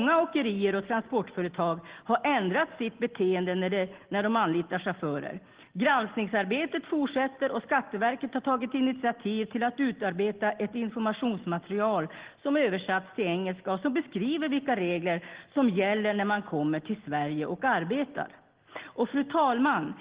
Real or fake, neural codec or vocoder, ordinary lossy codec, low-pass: real; none; Opus, 16 kbps; 3.6 kHz